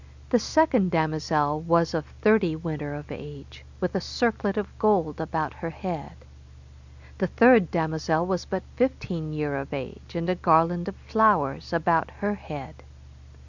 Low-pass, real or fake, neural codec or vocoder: 7.2 kHz; real; none